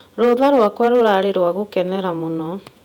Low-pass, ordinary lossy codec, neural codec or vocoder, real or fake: 19.8 kHz; none; vocoder, 48 kHz, 128 mel bands, Vocos; fake